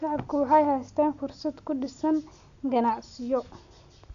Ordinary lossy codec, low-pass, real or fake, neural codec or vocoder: none; 7.2 kHz; real; none